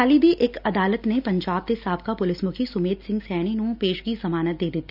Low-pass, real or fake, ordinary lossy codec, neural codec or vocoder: 5.4 kHz; real; none; none